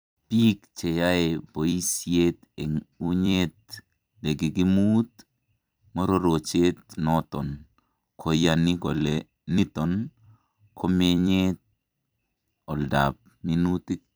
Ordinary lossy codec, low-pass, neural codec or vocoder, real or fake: none; none; vocoder, 44.1 kHz, 128 mel bands every 256 samples, BigVGAN v2; fake